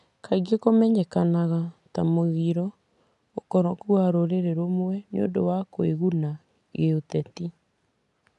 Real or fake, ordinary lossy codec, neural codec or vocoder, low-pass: real; none; none; 10.8 kHz